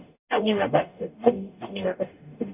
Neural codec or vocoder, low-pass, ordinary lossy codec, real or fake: codec, 44.1 kHz, 0.9 kbps, DAC; 3.6 kHz; none; fake